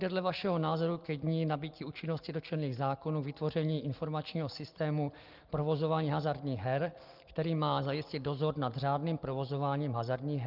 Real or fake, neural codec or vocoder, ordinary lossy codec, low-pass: real; none; Opus, 32 kbps; 5.4 kHz